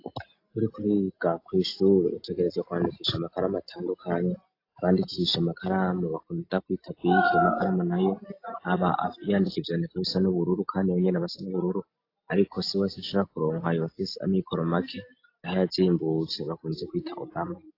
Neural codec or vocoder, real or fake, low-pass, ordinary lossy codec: none; real; 5.4 kHz; AAC, 32 kbps